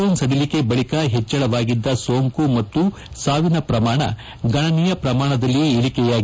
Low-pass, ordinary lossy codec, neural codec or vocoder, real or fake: none; none; none; real